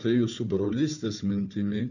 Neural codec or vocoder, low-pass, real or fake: codec, 16 kHz, 4 kbps, FunCodec, trained on Chinese and English, 50 frames a second; 7.2 kHz; fake